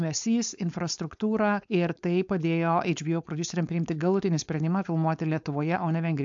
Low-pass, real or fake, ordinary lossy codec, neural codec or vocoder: 7.2 kHz; fake; AAC, 64 kbps; codec, 16 kHz, 4.8 kbps, FACodec